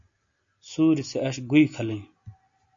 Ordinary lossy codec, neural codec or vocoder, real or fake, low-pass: MP3, 48 kbps; none; real; 7.2 kHz